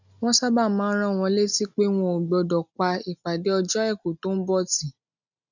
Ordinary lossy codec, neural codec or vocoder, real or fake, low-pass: none; none; real; 7.2 kHz